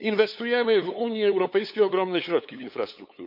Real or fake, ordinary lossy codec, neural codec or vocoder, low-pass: fake; none; codec, 16 kHz, 8 kbps, FunCodec, trained on LibriTTS, 25 frames a second; 5.4 kHz